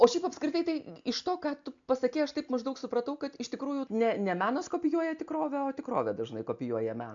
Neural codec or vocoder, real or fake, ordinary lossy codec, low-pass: none; real; AAC, 64 kbps; 7.2 kHz